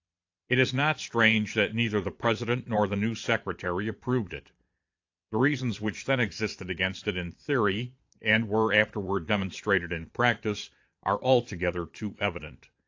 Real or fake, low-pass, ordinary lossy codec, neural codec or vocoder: fake; 7.2 kHz; AAC, 48 kbps; vocoder, 22.05 kHz, 80 mel bands, Vocos